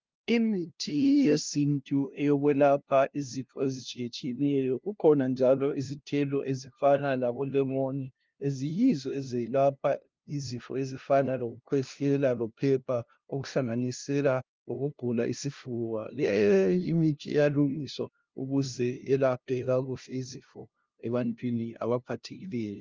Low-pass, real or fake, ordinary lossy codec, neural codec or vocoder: 7.2 kHz; fake; Opus, 32 kbps; codec, 16 kHz, 0.5 kbps, FunCodec, trained on LibriTTS, 25 frames a second